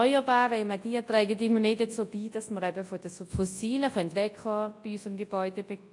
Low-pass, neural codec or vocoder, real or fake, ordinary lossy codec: 10.8 kHz; codec, 24 kHz, 0.9 kbps, WavTokenizer, large speech release; fake; AAC, 48 kbps